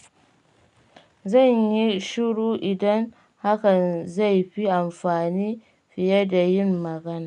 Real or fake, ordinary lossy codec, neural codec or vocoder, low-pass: real; none; none; 10.8 kHz